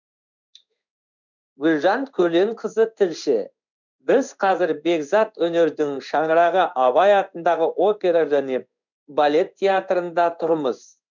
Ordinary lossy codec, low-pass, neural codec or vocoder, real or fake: none; 7.2 kHz; codec, 16 kHz in and 24 kHz out, 1 kbps, XY-Tokenizer; fake